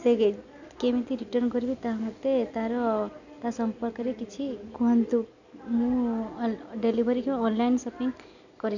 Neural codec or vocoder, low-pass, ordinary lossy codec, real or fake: none; 7.2 kHz; none; real